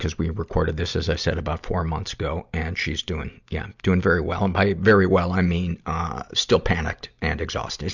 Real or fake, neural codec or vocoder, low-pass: real; none; 7.2 kHz